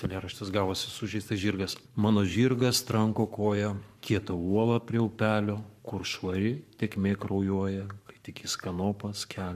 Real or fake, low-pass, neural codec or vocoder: fake; 14.4 kHz; codec, 44.1 kHz, 7.8 kbps, Pupu-Codec